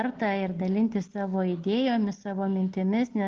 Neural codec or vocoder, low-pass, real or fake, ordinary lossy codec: none; 7.2 kHz; real; Opus, 16 kbps